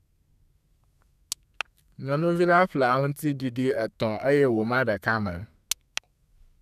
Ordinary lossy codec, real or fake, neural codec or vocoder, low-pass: none; fake; codec, 32 kHz, 1.9 kbps, SNAC; 14.4 kHz